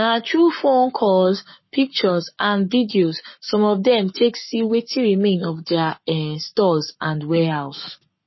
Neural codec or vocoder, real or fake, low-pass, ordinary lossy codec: codec, 44.1 kHz, 7.8 kbps, Pupu-Codec; fake; 7.2 kHz; MP3, 24 kbps